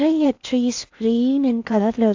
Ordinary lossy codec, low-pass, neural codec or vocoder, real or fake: AAC, 48 kbps; 7.2 kHz; codec, 16 kHz in and 24 kHz out, 0.8 kbps, FocalCodec, streaming, 65536 codes; fake